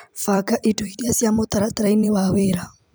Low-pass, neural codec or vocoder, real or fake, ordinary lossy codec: none; vocoder, 44.1 kHz, 128 mel bands every 256 samples, BigVGAN v2; fake; none